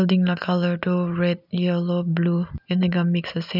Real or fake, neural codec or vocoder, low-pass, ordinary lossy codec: real; none; 5.4 kHz; none